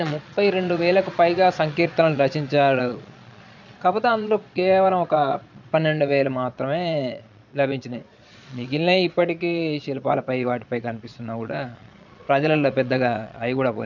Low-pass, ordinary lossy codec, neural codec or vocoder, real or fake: 7.2 kHz; none; vocoder, 22.05 kHz, 80 mel bands, Vocos; fake